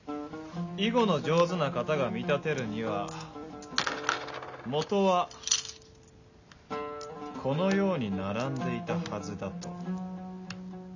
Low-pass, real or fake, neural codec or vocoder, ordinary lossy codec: 7.2 kHz; real; none; none